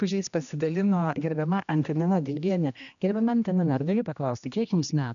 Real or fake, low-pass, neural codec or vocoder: fake; 7.2 kHz; codec, 16 kHz, 1 kbps, X-Codec, HuBERT features, trained on general audio